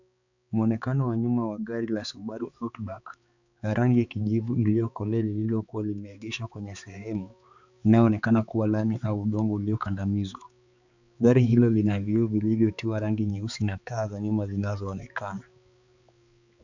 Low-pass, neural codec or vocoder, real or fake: 7.2 kHz; codec, 16 kHz, 4 kbps, X-Codec, HuBERT features, trained on balanced general audio; fake